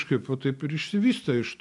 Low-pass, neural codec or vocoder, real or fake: 10.8 kHz; none; real